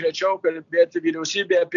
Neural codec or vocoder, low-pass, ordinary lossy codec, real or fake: none; 7.2 kHz; MP3, 96 kbps; real